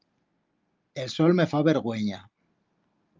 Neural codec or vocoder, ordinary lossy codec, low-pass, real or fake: none; Opus, 24 kbps; 7.2 kHz; real